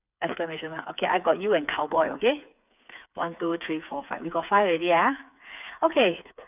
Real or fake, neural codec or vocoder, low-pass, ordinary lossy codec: fake; codec, 16 kHz, 4 kbps, FreqCodec, smaller model; 3.6 kHz; none